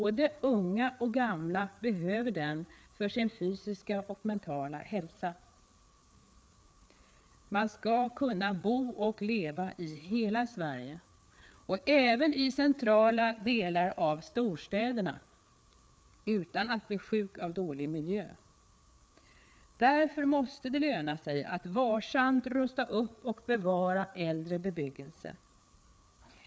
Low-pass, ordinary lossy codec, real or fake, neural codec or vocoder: none; none; fake; codec, 16 kHz, 4 kbps, FreqCodec, larger model